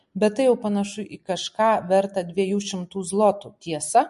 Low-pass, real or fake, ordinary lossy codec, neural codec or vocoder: 14.4 kHz; real; MP3, 48 kbps; none